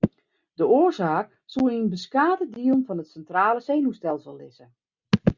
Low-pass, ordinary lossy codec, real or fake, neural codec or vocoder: 7.2 kHz; Opus, 64 kbps; real; none